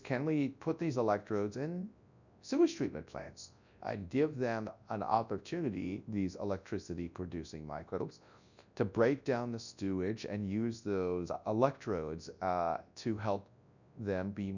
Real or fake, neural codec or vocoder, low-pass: fake; codec, 24 kHz, 0.9 kbps, WavTokenizer, large speech release; 7.2 kHz